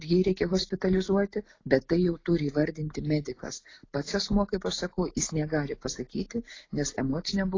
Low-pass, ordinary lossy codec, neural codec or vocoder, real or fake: 7.2 kHz; AAC, 32 kbps; none; real